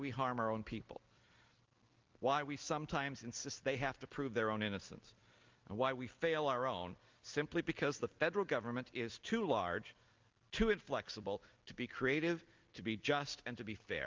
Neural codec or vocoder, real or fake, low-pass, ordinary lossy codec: none; real; 7.2 kHz; Opus, 24 kbps